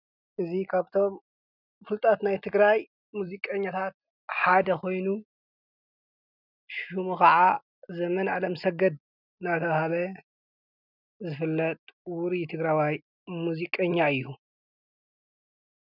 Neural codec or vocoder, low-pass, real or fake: none; 5.4 kHz; real